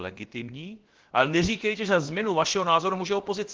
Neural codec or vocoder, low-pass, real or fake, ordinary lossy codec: codec, 16 kHz, about 1 kbps, DyCAST, with the encoder's durations; 7.2 kHz; fake; Opus, 16 kbps